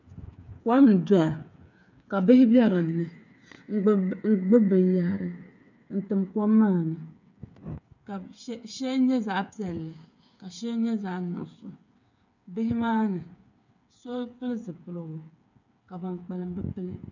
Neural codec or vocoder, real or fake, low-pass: codec, 16 kHz, 8 kbps, FreqCodec, smaller model; fake; 7.2 kHz